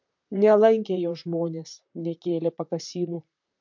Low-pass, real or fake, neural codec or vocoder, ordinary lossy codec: 7.2 kHz; fake; vocoder, 44.1 kHz, 128 mel bands, Pupu-Vocoder; MP3, 48 kbps